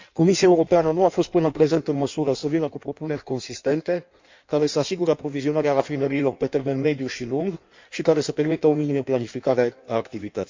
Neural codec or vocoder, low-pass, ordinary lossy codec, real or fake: codec, 16 kHz in and 24 kHz out, 1.1 kbps, FireRedTTS-2 codec; 7.2 kHz; MP3, 64 kbps; fake